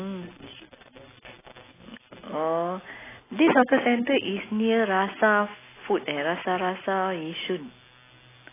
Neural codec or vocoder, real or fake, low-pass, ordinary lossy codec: none; real; 3.6 kHz; AAC, 16 kbps